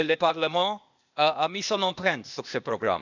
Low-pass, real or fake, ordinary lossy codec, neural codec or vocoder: 7.2 kHz; fake; none; codec, 16 kHz, 0.8 kbps, ZipCodec